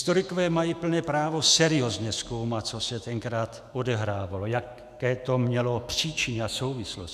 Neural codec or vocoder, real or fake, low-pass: autoencoder, 48 kHz, 128 numbers a frame, DAC-VAE, trained on Japanese speech; fake; 14.4 kHz